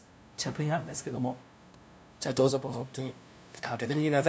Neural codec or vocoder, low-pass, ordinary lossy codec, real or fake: codec, 16 kHz, 0.5 kbps, FunCodec, trained on LibriTTS, 25 frames a second; none; none; fake